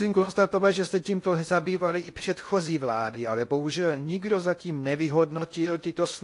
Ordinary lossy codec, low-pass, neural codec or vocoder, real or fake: AAC, 48 kbps; 10.8 kHz; codec, 16 kHz in and 24 kHz out, 0.6 kbps, FocalCodec, streaming, 2048 codes; fake